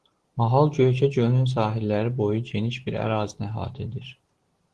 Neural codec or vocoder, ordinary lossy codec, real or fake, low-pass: none; Opus, 16 kbps; real; 10.8 kHz